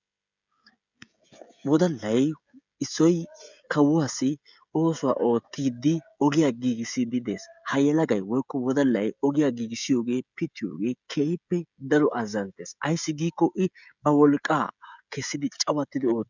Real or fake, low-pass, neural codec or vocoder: fake; 7.2 kHz; codec, 16 kHz, 16 kbps, FreqCodec, smaller model